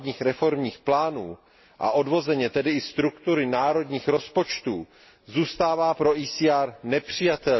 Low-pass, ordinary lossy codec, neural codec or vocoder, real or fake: 7.2 kHz; MP3, 24 kbps; none; real